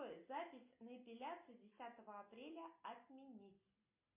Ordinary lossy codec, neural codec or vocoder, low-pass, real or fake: MP3, 32 kbps; none; 3.6 kHz; real